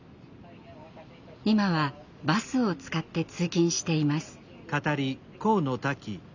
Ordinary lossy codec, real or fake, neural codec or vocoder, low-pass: none; real; none; 7.2 kHz